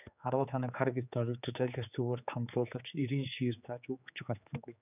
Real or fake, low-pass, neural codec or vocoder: fake; 3.6 kHz; codec, 16 kHz, 4 kbps, X-Codec, HuBERT features, trained on general audio